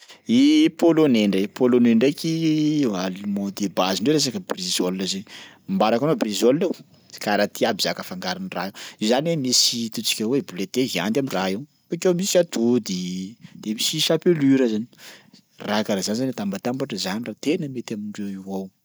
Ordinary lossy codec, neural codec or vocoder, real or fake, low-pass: none; none; real; none